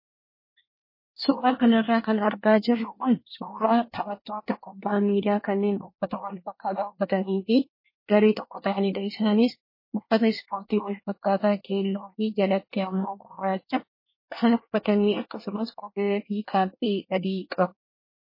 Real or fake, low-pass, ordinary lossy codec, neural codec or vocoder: fake; 5.4 kHz; MP3, 24 kbps; codec, 24 kHz, 1 kbps, SNAC